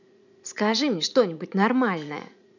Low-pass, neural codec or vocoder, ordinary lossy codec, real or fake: 7.2 kHz; vocoder, 44.1 kHz, 80 mel bands, Vocos; none; fake